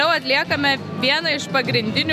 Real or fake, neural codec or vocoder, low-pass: real; none; 14.4 kHz